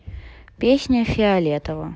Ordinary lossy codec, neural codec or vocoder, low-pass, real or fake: none; none; none; real